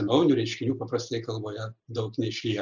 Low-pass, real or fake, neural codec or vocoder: 7.2 kHz; real; none